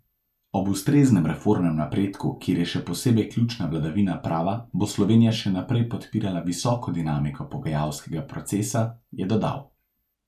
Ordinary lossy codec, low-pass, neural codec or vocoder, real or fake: none; 14.4 kHz; none; real